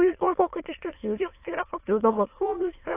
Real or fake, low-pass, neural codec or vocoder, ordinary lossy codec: fake; 3.6 kHz; autoencoder, 22.05 kHz, a latent of 192 numbers a frame, VITS, trained on many speakers; AAC, 24 kbps